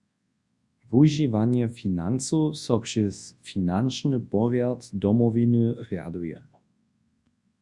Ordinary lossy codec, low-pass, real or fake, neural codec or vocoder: Opus, 64 kbps; 10.8 kHz; fake; codec, 24 kHz, 0.9 kbps, WavTokenizer, large speech release